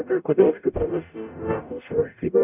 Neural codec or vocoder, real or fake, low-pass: codec, 44.1 kHz, 0.9 kbps, DAC; fake; 3.6 kHz